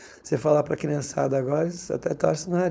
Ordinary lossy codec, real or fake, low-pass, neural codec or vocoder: none; fake; none; codec, 16 kHz, 4.8 kbps, FACodec